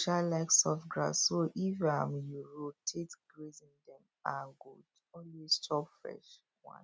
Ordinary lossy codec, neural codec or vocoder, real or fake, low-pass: none; none; real; none